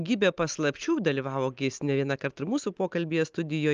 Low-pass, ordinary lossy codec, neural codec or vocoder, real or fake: 7.2 kHz; Opus, 24 kbps; none; real